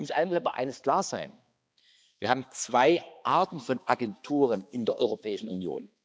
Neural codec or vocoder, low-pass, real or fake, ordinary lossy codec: codec, 16 kHz, 2 kbps, X-Codec, HuBERT features, trained on balanced general audio; none; fake; none